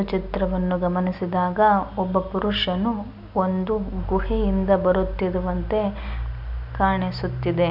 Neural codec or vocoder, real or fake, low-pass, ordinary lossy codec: none; real; 5.4 kHz; none